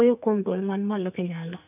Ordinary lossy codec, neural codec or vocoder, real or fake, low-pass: none; codec, 44.1 kHz, 2.6 kbps, SNAC; fake; 3.6 kHz